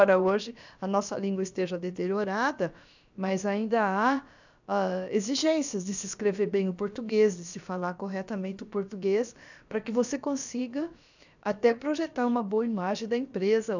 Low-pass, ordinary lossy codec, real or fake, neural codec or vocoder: 7.2 kHz; none; fake; codec, 16 kHz, about 1 kbps, DyCAST, with the encoder's durations